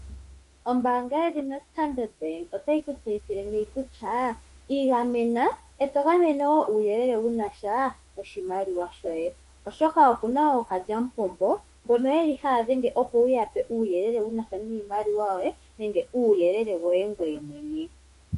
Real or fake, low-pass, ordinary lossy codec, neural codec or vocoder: fake; 14.4 kHz; MP3, 48 kbps; autoencoder, 48 kHz, 32 numbers a frame, DAC-VAE, trained on Japanese speech